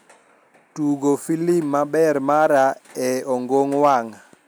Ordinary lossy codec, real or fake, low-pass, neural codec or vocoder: none; real; none; none